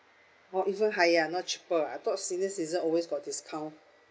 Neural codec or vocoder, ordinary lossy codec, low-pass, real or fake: none; none; none; real